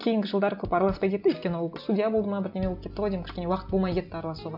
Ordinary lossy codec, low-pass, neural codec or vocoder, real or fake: none; 5.4 kHz; none; real